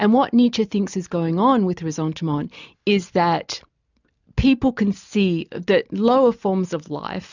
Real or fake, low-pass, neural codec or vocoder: real; 7.2 kHz; none